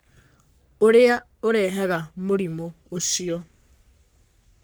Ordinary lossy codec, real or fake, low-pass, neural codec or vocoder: none; fake; none; codec, 44.1 kHz, 3.4 kbps, Pupu-Codec